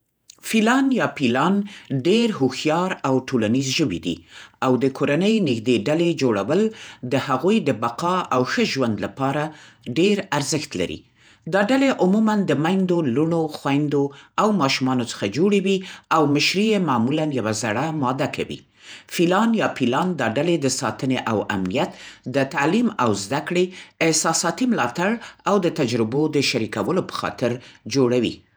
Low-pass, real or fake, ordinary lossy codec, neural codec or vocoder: none; fake; none; vocoder, 48 kHz, 128 mel bands, Vocos